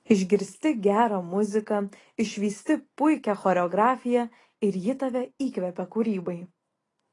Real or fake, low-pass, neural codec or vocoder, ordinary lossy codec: real; 10.8 kHz; none; AAC, 32 kbps